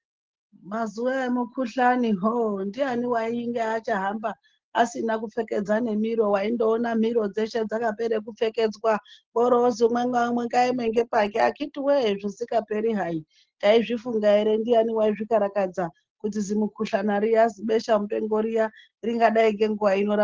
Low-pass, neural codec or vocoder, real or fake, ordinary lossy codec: 7.2 kHz; none; real; Opus, 16 kbps